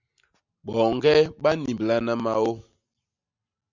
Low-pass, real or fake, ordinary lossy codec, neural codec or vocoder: 7.2 kHz; real; Opus, 64 kbps; none